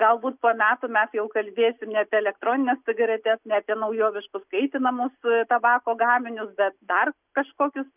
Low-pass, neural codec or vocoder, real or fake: 3.6 kHz; none; real